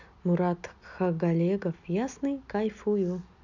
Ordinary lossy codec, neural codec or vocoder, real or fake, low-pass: none; none; real; 7.2 kHz